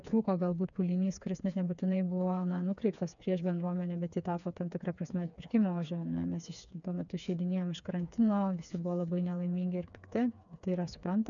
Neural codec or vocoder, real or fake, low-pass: codec, 16 kHz, 4 kbps, FreqCodec, smaller model; fake; 7.2 kHz